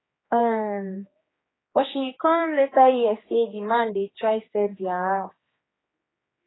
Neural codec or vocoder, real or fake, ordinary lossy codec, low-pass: codec, 16 kHz, 2 kbps, X-Codec, HuBERT features, trained on general audio; fake; AAC, 16 kbps; 7.2 kHz